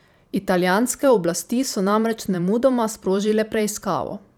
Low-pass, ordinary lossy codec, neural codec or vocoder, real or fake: none; none; vocoder, 44.1 kHz, 128 mel bands every 512 samples, BigVGAN v2; fake